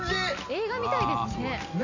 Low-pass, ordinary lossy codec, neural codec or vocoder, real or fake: 7.2 kHz; none; none; real